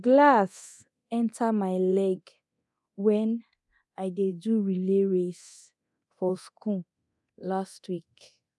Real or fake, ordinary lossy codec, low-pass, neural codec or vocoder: fake; none; none; codec, 24 kHz, 0.9 kbps, DualCodec